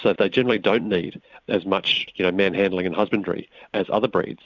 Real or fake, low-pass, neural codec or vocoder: fake; 7.2 kHz; vocoder, 44.1 kHz, 128 mel bands every 256 samples, BigVGAN v2